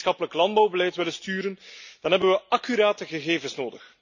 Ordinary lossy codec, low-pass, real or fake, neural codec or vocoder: none; 7.2 kHz; real; none